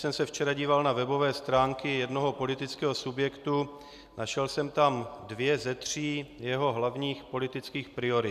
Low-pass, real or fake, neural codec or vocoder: 14.4 kHz; real; none